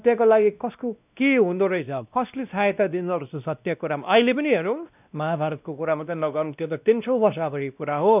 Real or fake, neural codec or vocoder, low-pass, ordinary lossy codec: fake; codec, 16 kHz, 1 kbps, X-Codec, WavLM features, trained on Multilingual LibriSpeech; 3.6 kHz; none